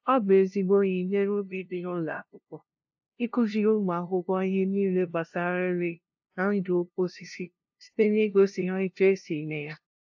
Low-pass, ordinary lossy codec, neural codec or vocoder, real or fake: 7.2 kHz; AAC, 48 kbps; codec, 16 kHz, 0.5 kbps, FunCodec, trained on LibriTTS, 25 frames a second; fake